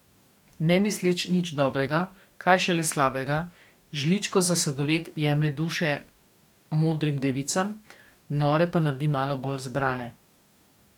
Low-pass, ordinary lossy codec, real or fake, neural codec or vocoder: 19.8 kHz; none; fake; codec, 44.1 kHz, 2.6 kbps, DAC